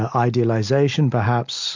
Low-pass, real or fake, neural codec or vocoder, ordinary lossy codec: 7.2 kHz; real; none; MP3, 64 kbps